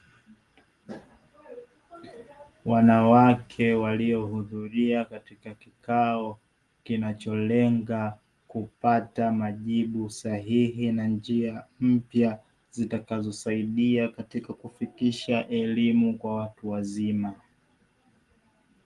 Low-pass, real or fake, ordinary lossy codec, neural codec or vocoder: 10.8 kHz; real; Opus, 24 kbps; none